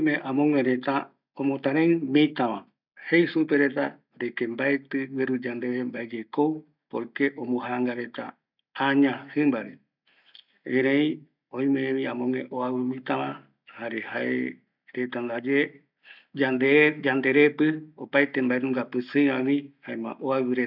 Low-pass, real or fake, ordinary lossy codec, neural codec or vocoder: 5.4 kHz; real; none; none